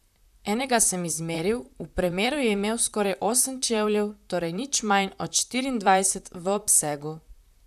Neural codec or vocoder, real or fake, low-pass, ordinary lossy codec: vocoder, 44.1 kHz, 128 mel bands, Pupu-Vocoder; fake; 14.4 kHz; none